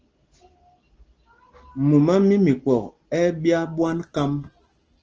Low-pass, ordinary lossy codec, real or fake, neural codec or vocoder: 7.2 kHz; Opus, 16 kbps; real; none